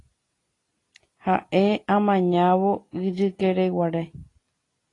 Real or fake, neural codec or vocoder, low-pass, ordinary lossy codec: real; none; 10.8 kHz; AAC, 32 kbps